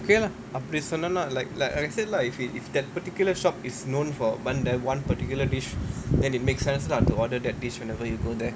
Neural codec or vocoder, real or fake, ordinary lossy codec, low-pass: none; real; none; none